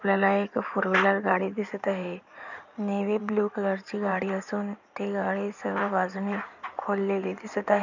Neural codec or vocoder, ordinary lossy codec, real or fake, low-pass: codec, 16 kHz in and 24 kHz out, 2.2 kbps, FireRedTTS-2 codec; none; fake; 7.2 kHz